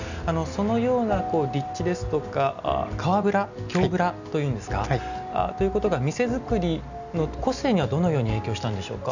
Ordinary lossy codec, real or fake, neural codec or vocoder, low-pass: none; real; none; 7.2 kHz